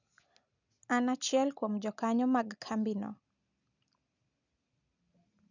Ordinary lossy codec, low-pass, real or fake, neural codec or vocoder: none; 7.2 kHz; real; none